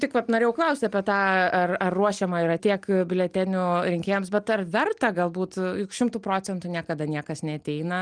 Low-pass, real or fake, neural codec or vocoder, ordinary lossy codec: 9.9 kHz; real; none; Opus, 32 kbps